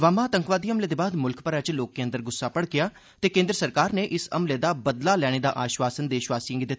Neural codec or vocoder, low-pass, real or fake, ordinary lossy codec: none; none; real; none